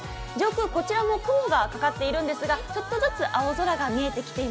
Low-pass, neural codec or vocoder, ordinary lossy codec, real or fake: none; none; none; real